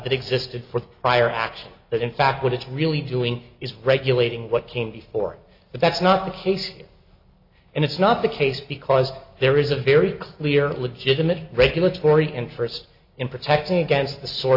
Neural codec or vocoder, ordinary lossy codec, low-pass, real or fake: none; AAC, 48 kbps; 5.4 kHz; real